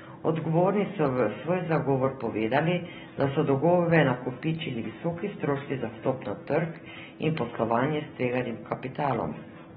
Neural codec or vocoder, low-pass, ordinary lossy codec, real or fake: none; 19.8 kHz; AAC, 16 kbps; real